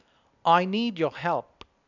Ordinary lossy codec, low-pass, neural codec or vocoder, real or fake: none; 7.2 kHz; none; real